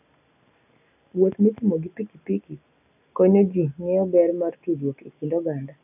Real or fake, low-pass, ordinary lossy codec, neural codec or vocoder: real; 3.6 kHz; none; none